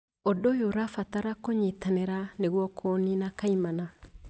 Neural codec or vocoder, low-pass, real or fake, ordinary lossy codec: none; none; real; none